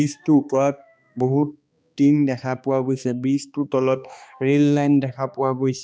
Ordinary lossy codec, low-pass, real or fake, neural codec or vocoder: none; none; fake; codec, 16 kHz, 2 kbps, X-Codec, HuBERT features, trained on balanced general audio